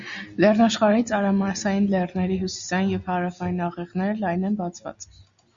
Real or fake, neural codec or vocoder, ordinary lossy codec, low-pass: real; none; Opus, 64 kbps; 7.2 kHz